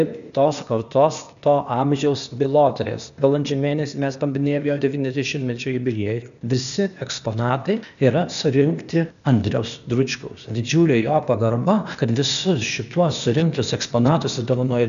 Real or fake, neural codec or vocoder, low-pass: fake; codec, 16 kHz, 0.8 kbps, ZipCodec; 7.2 kHz